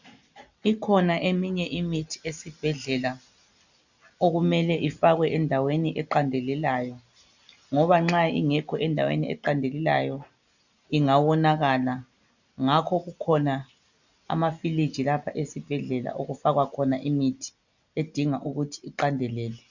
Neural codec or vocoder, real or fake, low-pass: none; real; 7.2 kHz